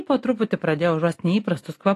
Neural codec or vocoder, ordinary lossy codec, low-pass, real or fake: none; AAC, 48 kbps; 14.4 kHz; real